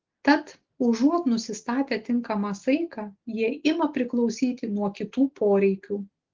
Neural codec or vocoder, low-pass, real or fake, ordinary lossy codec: none; 7.2 kHz; real; Opus, 16 kbps